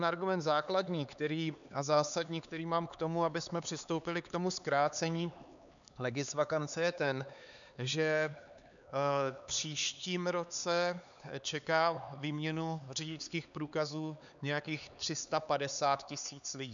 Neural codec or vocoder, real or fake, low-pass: codec, 16 kHz, 4 kbps, X-Codec, HuBERT features, trained on LibriSpeech; fake; 7.2 kHz